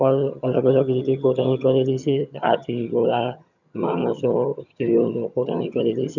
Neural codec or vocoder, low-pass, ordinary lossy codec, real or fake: vocoder, 22.05 kHz, 80 mel bands, HiFi-GAN; 7.2 kHz; none; fake